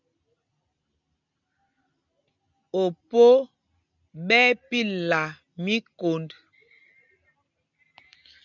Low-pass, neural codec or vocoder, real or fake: 7.2 kHz; none; real